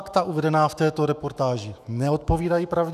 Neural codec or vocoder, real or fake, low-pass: autoencoder, 48 kHz, 128 numbers a frame, DAC-VAE, trained on Japanese speech; fake; 14.4 kHz